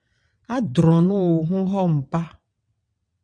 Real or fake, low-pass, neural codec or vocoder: fake; 9.9 kHz; vocoder, 22.05 kHz, 80 mel bands, WaveNeXt